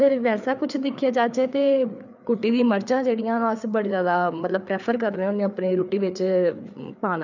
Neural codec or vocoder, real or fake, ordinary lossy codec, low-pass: codec, 16 kHz, 4 kbps, FreqCodec, larger model; fake; none; 7.2 kHz